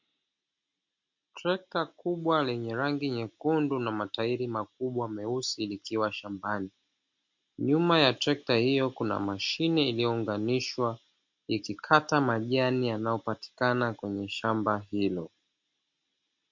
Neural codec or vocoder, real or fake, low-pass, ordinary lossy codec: none; real; 7.2 kHz; MP3, 48 kbps